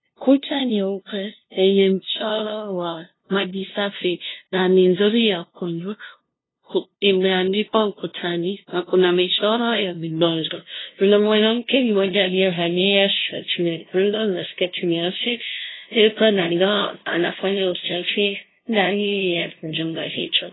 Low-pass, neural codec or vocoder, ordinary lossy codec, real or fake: 7.2 kHz; codec, 16 kHz, 0.5 kbps, FunCodec, trained on LibriTTS, 25 frames a second; AAC, 16 kbps; fake